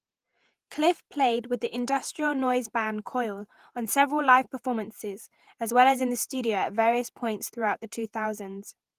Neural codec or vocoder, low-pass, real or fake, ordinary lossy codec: vocoder, 48 kHz, 128 mel bands, Vocos; 14.4 kHz; fake; Opus, 24 kbps